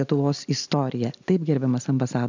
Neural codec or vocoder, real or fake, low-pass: none; real; 7.2 kHz